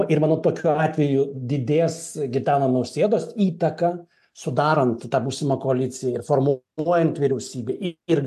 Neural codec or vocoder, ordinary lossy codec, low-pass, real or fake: none; AAC, 96 kbps; 14.4 kHz; real